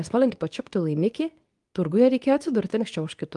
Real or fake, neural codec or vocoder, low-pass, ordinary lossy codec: fake; codec, 24 kHz, 0.9 kbps, WavTokenizer, medium speech release version 2; 10.8 kHz; Opus, 32 kbps